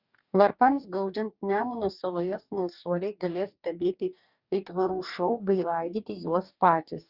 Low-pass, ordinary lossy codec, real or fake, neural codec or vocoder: 5.4 kHz; Opus, 64 kbps; fake; codec, 44.1 kHz, 2.6 kbps, DAC